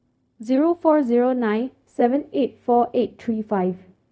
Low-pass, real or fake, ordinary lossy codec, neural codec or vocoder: none; fake; none; codec, 16 kHz, 0.4 kbps, LongCat-Audio-Codec